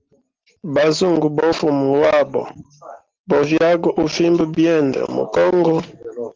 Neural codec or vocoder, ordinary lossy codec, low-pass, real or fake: none; Opus, 16 kbps; 7.2 kHz; real